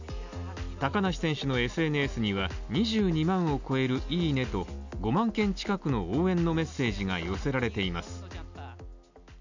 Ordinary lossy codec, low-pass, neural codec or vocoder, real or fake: none; 7.2 kHz; none; real